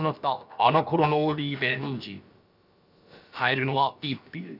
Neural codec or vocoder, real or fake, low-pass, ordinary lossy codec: codec, 16 kHz, about 1 kbps, DyCAST, with the encoder's durations; fake; 5.4 kHz; none